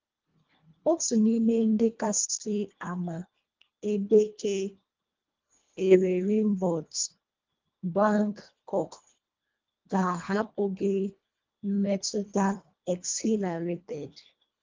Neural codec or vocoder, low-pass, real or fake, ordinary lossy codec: codec, 24 kHz, 1.5 kbps, HILCodec; 7.2 kHz; fake; Opus, 24 kbps